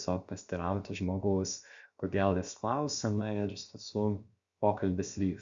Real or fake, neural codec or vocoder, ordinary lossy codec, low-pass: fake; codec, 16 kHz, about 1 kbps, DyCAST, with the encoder's durations; Opus, 64 kbps; 7.2 kHz